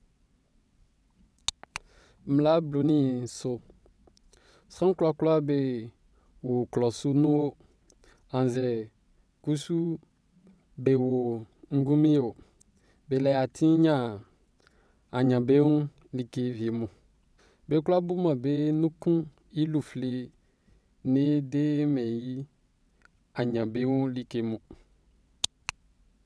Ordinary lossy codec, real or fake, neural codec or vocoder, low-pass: none; fake; vocoder, 22.05 kHz, 80 mel bands, WaveNeXt; none